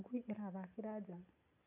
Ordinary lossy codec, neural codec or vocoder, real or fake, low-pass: none; none; real; 3.6 kHz